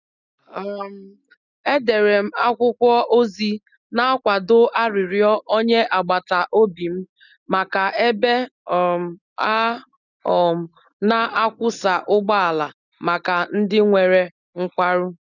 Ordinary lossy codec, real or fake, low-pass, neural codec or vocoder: none; real; 7.2 kHz; none